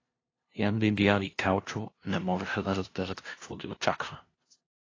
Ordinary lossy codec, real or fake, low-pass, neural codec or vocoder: AAC, 32 kbps; fake; 7.2 kHz; codec, 16 kHz, 0.5 kbps, FunCodec, trained on LibriTTS, 25 frames a second